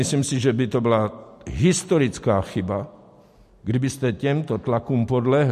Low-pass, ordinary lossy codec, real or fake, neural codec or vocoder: 14.4 kHz; MP3, 64 kbps; real; none